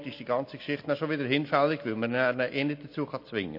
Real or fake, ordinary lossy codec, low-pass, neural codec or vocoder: real; MP3, 32 kbps; 5.4 kHz; none